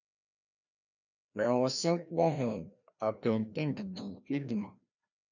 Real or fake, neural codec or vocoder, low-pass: fake; codec, 16 kHz, 1 kbps, FreqCodec, larger model; 7.2 kHz